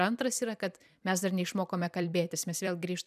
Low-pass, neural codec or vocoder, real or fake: 14.4 kHz; vocoder, 44.1 kHz, 128 mel bands every 256 samples, BigVGAN v2; fake